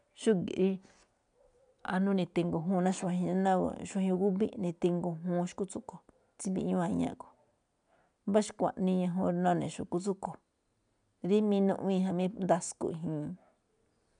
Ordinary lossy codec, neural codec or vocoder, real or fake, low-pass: none; none; real; 9.9 kHz